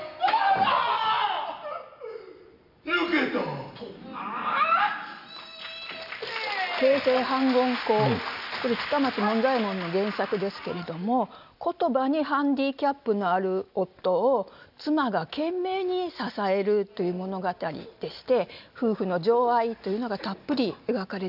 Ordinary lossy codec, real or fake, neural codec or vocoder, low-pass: none; real; none; 5.4 kHz